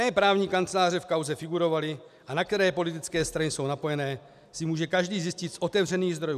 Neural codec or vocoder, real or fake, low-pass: none; real; 14.4 kHz